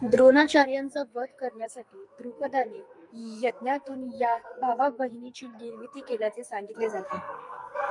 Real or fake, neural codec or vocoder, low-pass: fake; codec, 44.1 kHz, 2.6 kbps, SNAC; 10.8 kHz